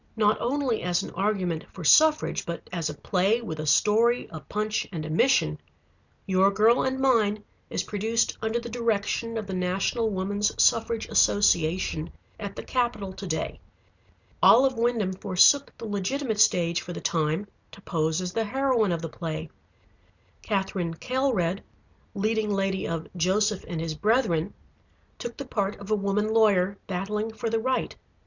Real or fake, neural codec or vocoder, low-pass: real; none; 7.2 kHz